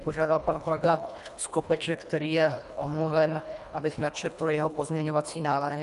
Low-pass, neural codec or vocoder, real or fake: 10.8 kHz; codec, 24 kHz, 1.5 kbps, HILCodec; fake